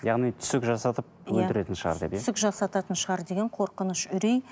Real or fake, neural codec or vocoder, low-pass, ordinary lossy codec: real; none; none; none